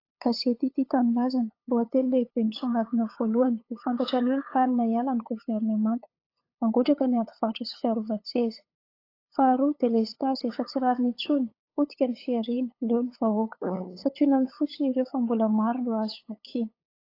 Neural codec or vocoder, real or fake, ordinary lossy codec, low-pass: codec, 16 kHz, 8 kbps, FunCodec, trained on LibriTTS, 25 frames a second; fake; AAC, 32 kbps; 5.4 kHz